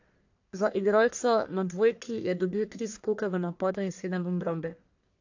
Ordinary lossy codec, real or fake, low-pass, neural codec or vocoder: AAC, 48 kbps; fake; 7.2 kHz; codec, 44.1 kHz, 1.7 kbps, Pupu-Codec